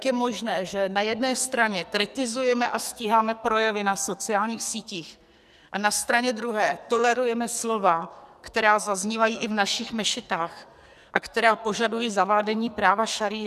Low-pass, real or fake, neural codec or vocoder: 14.4 kHz; fake; codec, 44.1 kHz, 2.6 kbps, SNAC